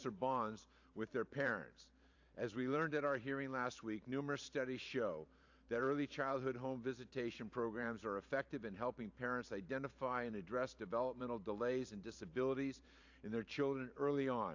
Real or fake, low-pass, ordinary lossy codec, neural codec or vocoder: fake; 7.2 kHz; AAC, 48 kbps; vocoder, 44.1 kHz, 128 mel bands every 512 samples, BigVGAN v2